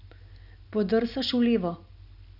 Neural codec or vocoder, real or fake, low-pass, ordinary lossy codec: none; real; 5.4 kHz; none